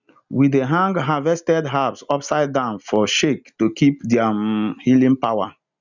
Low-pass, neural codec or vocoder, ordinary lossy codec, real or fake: 7.2 kHz; none; none; real